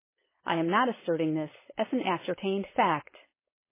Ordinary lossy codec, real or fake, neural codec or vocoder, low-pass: MP3, 16 kbps; fake; codec, 16 kHz, 4.8 kbps, FACodec; 3.6 kHz